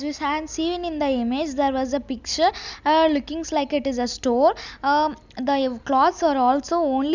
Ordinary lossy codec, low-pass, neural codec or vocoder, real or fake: none; 7.2 kHz; none; real